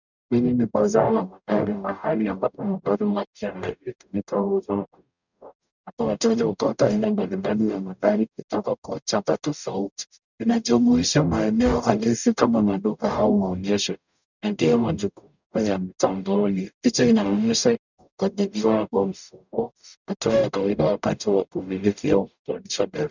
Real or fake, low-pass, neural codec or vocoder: fake; 7.2 kHz; codec, 44.1 kHz, 0.9 kbps, DAC